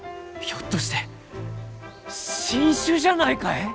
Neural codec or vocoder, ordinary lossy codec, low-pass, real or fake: none; none; none; real